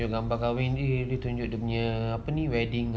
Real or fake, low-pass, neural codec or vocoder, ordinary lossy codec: real; none; none; none